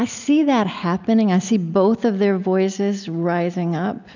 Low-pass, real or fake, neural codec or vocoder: 7.2 kHz; real; none